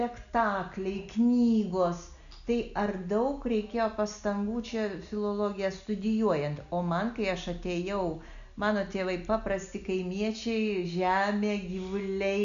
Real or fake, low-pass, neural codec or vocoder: real; 7.2 kHz; none